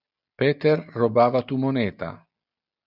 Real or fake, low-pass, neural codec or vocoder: real; 5.4 kHz; none